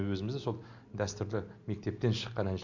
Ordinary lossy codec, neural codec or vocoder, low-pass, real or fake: none; none; 7.2 kHz; real